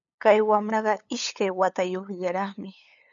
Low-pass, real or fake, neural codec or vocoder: 7.2 kHz; fake; codec, 16 kHz, 8 kbps, FunCodec, trained on LibriTTS, 25 frames a second